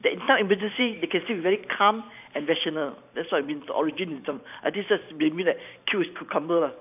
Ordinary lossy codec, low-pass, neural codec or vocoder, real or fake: none; 3.6 kHz; vocoder, 44.1 kHz, 128 mel bands every 512 samples, BigVGAN v2; fake